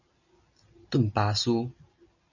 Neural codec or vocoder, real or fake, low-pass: none; real; 7.2 kHz